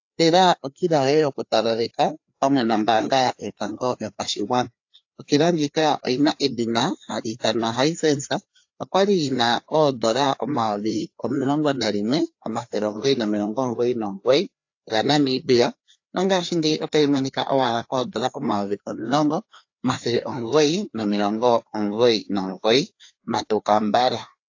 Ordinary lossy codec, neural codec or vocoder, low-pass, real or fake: AAC, 48 kbps; codec, 16 kHz, 2 kbps, FreqCodec, larger model; 7.2 kHz; fake